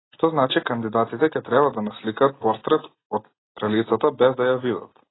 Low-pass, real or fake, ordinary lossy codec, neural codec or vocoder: 7.2 kHz; real; AAC, 16 kbps; none